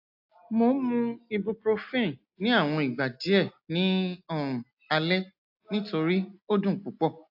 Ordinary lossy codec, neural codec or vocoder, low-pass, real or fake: AAC, 48 kbps; none; 5.4 kHz; real